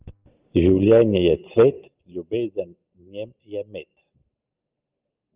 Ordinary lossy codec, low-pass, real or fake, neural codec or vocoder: Opus, 32 kbps; 3.6 kHz; real; none